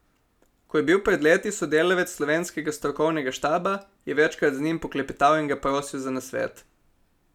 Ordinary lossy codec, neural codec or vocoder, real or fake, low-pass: none; none; real; 19.8 kHz